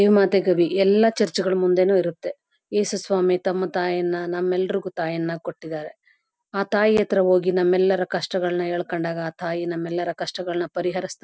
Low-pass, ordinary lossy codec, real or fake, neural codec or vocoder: none; none; real; none